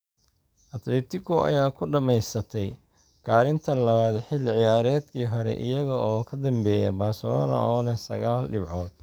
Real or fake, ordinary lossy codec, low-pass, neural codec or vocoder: fake; none; none; codec, 44.1 kHz, 7.8 kbps, DAC